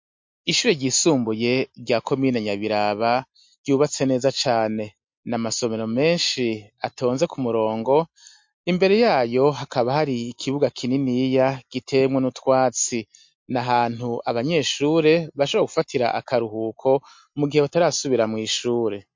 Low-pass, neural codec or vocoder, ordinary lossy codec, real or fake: 7.2 kHz; none; MP3, 48 kbps; real